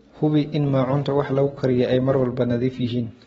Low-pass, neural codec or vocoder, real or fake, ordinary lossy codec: 19.8 kHz; none; real; AAC, 24 kbps